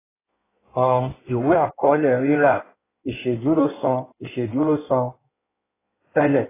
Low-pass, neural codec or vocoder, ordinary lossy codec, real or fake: 3.6 kHz; codec, 16 kHz in and 24 kHz out, 1.1 kbps, FireRedTTS-2 codec; AAC, 16 kbps; fake